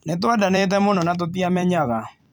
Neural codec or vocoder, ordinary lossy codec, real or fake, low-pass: vocoder, 44.1 kHz, 128 mel bands every 256 samples, BigVGAN v2; none; fake; 19.8 kHz